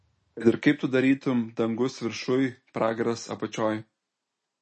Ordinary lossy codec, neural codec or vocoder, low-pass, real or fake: MP3, 32 kbps; none; 10.8 kHz; real